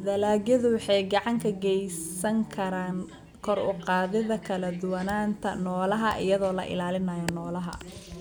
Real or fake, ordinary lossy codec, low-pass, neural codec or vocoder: real; none; none; none